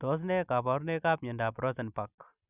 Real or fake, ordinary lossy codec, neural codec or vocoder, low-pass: real; none; none; 3.6 kHz